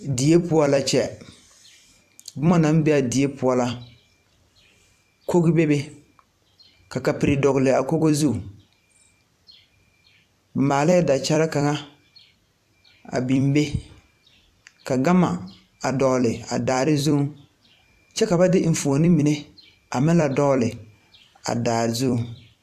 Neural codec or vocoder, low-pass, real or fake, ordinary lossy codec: vocoder, 44.1 kHz, 128 mel bands every 256 samples, BigVGAN v2; 14.4 kHz; fake; AAC, 96 kbps